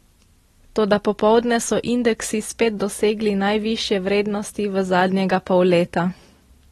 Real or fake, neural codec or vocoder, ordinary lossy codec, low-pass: real; none; AAC, 32 kbps; 19.8 kHz